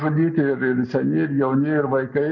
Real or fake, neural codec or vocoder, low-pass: real; none; 7.2 kHz